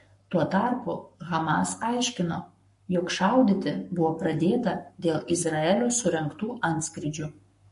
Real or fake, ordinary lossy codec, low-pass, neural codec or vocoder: fake; MP3, 48 kbps; 14.4 kHz; codec, 44.1 kHz, 7.8 kbps, Pupu-Codec